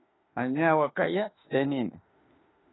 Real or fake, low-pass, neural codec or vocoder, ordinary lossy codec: fake; 7.2 kHz; autoencoder, 48 kHz, 32 numbers a frame, DAC-VAE, trained on Japanese speech; AAC, 16 kbps